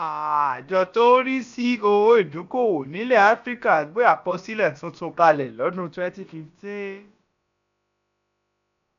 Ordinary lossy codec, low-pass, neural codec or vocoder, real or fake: none; 7.2 kHz; codec, 16 kHz, about 1 kbps, DyCAST, with the encoder's durations; fake